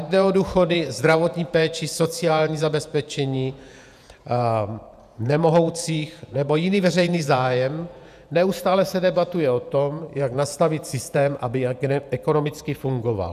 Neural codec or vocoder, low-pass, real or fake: vocoder, 48 kHz, 128 mel bands, Vocos; 14.4 kHz; fake